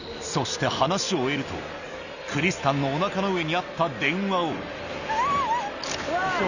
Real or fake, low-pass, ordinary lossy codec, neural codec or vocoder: real; 7.2 kHz; none; none